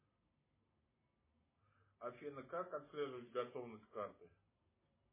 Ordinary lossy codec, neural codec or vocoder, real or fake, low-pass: MP3, 16 kbps; none; real; 3.6 kHz